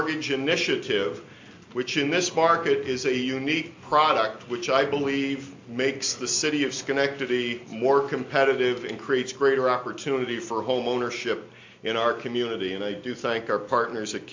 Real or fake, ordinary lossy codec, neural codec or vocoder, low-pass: real; MP3, 48 kbps; none; 7.2 kHz